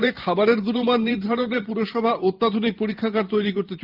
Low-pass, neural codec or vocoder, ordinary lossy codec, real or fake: 5.4 kHz; vocoder, 44.1 kHz, 128 mel bands every 512 samples, BigVGAN v2; Opus, 32 kbps; fake